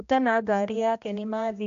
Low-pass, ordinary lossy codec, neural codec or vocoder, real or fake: 7.2 kHz; AAC, 64 kbps; codec, 16 kHz, 1 kbps, X-Codec, HuBERT features, trained on general audio; fake